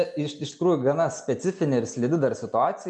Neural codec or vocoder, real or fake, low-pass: none; real; 10.8 kHz